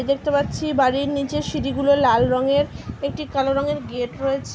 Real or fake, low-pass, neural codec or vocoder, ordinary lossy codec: real; none; none; none